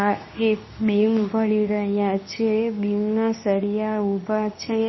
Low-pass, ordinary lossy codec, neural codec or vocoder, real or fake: 7.2 kHz; MP3, 24 kbps; codec, 24 kHz, 0.9 kbps, WavTokenizer, medium speech release version 2; fake